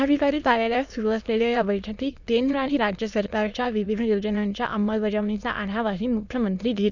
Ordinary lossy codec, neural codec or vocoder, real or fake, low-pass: none; autoencoder, 22.05 kHz, a latent of 192 numbers a frame, VITS, trained on many speakers; fake; 7.2 kHz